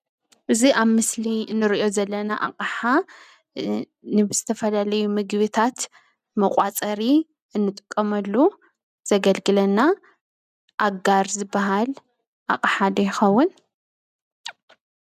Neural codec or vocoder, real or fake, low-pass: none; real; 14.4 kHz